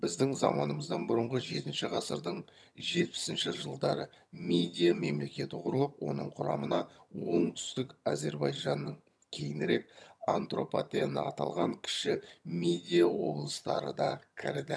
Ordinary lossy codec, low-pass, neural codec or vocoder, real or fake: none; none; vocoder, 22.05 kHz, 80 mel bands, HiFi-GAN; fake